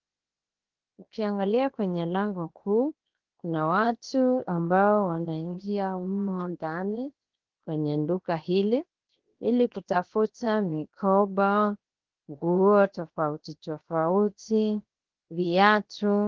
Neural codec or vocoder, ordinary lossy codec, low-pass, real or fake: codec, 16 kHz, 0.7 kbps, FocalCodec; Opus, 16 kbps; 7.2 kHz; fake